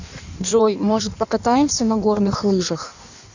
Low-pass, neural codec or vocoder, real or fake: 7.2 kHz; codec, 16 kHz in and 24 kHz out, 1.1 kbps, FireRedTTS-2 codec; fake